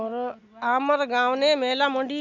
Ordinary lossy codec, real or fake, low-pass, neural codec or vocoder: none; real; 7.2 kHz; none